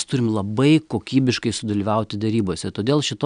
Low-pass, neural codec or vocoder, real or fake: 9.9 kHz; none; real